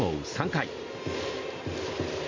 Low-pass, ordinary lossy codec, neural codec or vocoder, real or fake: 7.2 kHz; MP3, 48 kbps; none; real